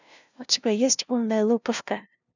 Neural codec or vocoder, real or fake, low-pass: codec, 16 kHz, 0.5 kbps, FunCodec, trained on LibriTTS, 25 frames a second; fake; 7.2 kHz